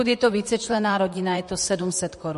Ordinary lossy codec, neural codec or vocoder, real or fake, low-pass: MP3, 48 kbps; vocoder, 44.1 kHz, 128 mel bands, Pupu-Vocoder; fake; 14.4 kHz